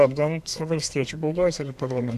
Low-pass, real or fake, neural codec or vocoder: 14.4 kHz; fake; codec, 32 kHz, 1.9 kbps, SNAC